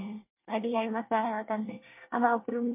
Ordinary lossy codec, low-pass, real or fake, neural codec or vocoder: MP3, 32 kbps; 3.6 kHz; fake; codec, 24 kHz, 1 kbps, SNAC